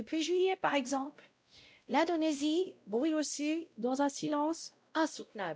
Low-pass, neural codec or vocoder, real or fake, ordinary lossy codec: none; codec, 16 kHz, 0.5 kbps, X-Codec, WavLM features, trained on Multilingual LibriSpeech; fake; none